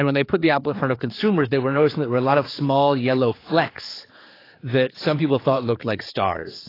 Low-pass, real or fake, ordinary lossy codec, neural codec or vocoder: 5.4 kHz; fake; AAC, 24 kbps; codec, 16 kHz, 4 kbps, X-Codec, HuBERT features, trained on general audio